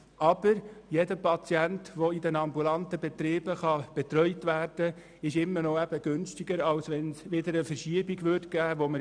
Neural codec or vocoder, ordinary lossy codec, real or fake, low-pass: none; none; real; 9.9 kHz